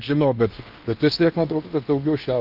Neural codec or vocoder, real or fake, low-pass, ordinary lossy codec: codec, 16 kHz in and 24 kHz out, 0.8 kbps, FocalCodec, streaming, 65536 codes; fake; 5.4 kHz; Opus, 32 kbps